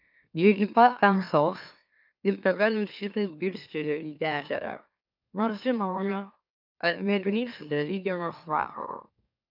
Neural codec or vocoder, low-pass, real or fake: autoencoder, 44.1 kHz, a latent of 192 numbers a frame, MeloTTS; 5.4 kHz; fake